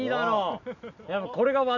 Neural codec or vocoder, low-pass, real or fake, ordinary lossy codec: none; 7.2 kHz; real; none